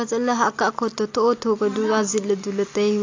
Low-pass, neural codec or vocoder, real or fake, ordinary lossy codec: 7.2 kHz; none; real; AAC, 48 kbps